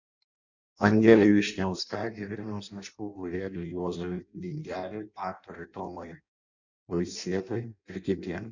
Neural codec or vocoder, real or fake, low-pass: codec, 16 kHz in and 24 kHz out, 0.6 kbps, FireRedTTS-2 codec; fake; 7.2 kHz